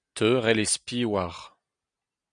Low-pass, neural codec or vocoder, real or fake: 9.9 kHz; none; real